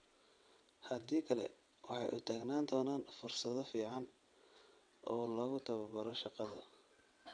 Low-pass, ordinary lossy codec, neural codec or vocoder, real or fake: 9.9 kHz; none; vocoder, 22.05 kHz, 80 mel bands, WaveNeXt; fake